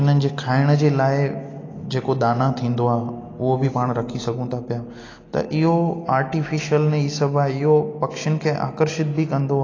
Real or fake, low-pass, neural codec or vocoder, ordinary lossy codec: real; 7.2 kHz; none; AAC, 32 kbps